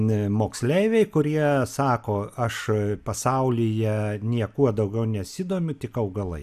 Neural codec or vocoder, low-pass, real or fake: none; 14.4 kHz; real